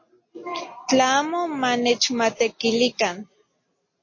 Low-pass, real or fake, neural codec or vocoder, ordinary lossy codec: 7.2 kHz; real; none; MP3, 32 kbps